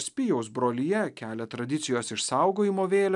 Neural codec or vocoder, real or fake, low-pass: none; real; 10.8 kHz